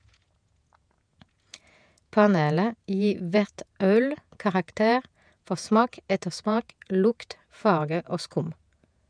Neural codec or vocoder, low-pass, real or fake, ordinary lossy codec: vocoder, 48 kHz, 128 mel bands, Vocos; 9.9 kHz; fake; none